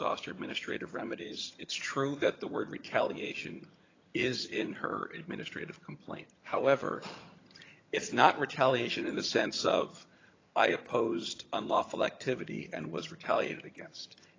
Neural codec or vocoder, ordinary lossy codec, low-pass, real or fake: vocoder, 22.05 kHz, 80 mel bands, HiFi-GAN; AAC, 32 kbps; 7.2 kHz; fake